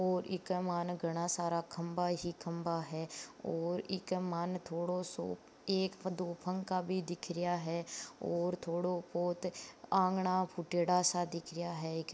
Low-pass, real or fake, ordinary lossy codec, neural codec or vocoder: none; real; none; none